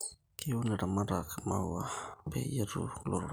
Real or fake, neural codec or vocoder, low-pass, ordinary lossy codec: real; none; none; none